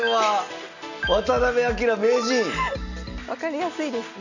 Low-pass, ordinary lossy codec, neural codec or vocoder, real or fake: 7.2 kHz; none; none; real